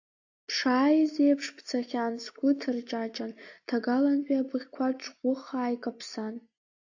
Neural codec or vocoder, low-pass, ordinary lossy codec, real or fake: none; 7.2 kHz; AAC, 48 kbps; real